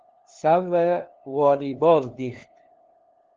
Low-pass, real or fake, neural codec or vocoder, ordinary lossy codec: 7.2 kHz; fake; codec, 16 kHz, 2 kbps, FunCodec, trained on LibriTTS, 25 frames a second; Opus, 16 kbps